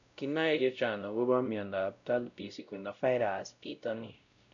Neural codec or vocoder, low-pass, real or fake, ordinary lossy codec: codec, 16 kHz, 0.5 kbps, X-Codec, WavLM features, trained on Multilingual LibriSpeech; 7.2 kHz; fake; none